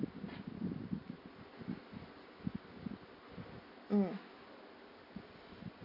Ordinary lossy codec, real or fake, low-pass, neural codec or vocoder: MP3, 24 kbps; real; 5.4 kHz; none